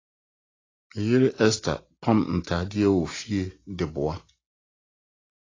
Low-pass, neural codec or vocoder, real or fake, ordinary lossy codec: 7.2 kHz; none; real; AAC, 32 kbps